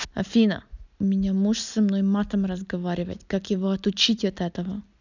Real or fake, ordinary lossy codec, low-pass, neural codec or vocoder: real; none; 7.2 kHz; none